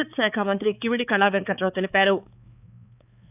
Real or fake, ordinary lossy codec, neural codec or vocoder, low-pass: fake; none; codec, 16 kHz, 4 kbps, X-Codec, HuBERT features, trained on balanced general audio; 3.6 kHz